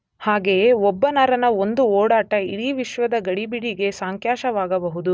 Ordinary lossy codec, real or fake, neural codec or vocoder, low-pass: none; real; none; none